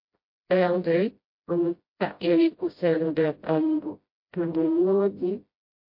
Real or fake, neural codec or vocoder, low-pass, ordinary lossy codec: fake; codec, 16 kHz, 0.5 kbps, FreqCodec, smaller model; 5.4 kHz; MP3, 32 kbps